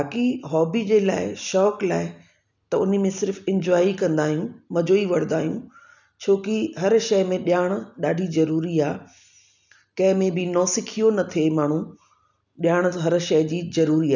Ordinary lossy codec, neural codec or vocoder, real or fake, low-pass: none; none; real; 7.2 kHz